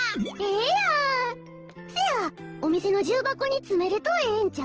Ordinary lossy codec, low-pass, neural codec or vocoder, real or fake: Opus, 16 kbps; 7.2 kHz; none; real